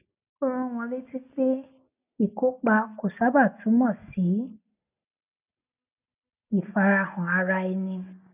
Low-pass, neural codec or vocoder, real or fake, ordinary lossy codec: 3.6 kHz; none; real; none